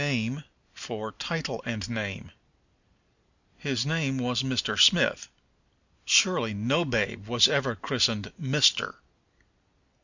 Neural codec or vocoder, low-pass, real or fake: none; 7.2 kHz; real